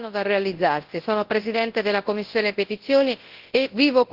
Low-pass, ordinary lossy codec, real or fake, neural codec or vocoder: 5.4 kHz; Opus, 16 kbps; fake; codec, 24 kHz, 0.9 kbps, WavTokenizer, large speech release